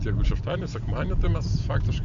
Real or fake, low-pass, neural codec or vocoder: real; 7.2 kHz; none